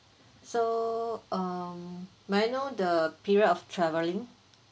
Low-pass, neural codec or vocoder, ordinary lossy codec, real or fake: none; none; none; real